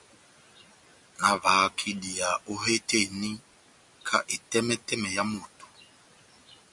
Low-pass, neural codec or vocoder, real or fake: 10.8 kHz; none; real